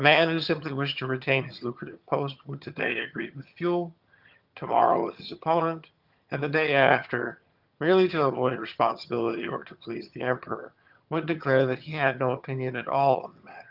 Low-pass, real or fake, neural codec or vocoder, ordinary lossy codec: 5.4 kHz; fake; vocoder, 22.05 kHz, 80 mel bands, HiFi-GAN; Opus, 24 kbps